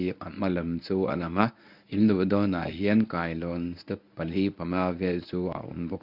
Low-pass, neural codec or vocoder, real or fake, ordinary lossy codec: 5.4 kHz; codec, 24 kHz, 0.9 kbps, WavTokenizer, medium speech release version 1; fake; none